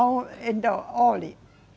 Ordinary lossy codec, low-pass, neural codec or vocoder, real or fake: none; none; none; real